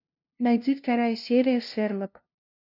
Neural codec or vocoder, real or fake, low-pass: codec, 16 kHz, 0.5 kbps, FunCodec, trained on LibriTTS, 25 frames a second; fake; 5.4 kHz